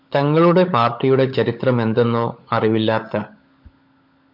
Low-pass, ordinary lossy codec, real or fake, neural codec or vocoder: 5.4 kHz; MP3, 48 kbps; fake; codec, 16 kHz, 16 kbps, FunCodec, trained on LibriTTS, 50 frames a second